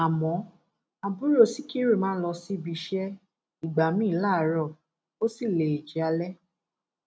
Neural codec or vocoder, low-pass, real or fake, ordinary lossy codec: none; none; real; none